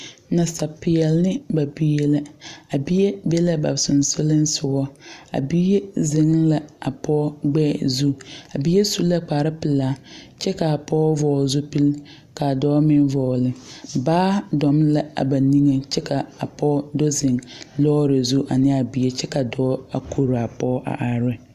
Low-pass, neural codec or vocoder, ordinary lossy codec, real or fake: 14.4 kHz; none; Opus, 64 kbps; real